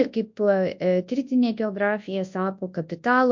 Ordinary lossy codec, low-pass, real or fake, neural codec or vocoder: MP3, 48 kbps; 7.2 kHz; fake; codec, 24 kHz, 0.9 kbps, WavTokenizer, large speech release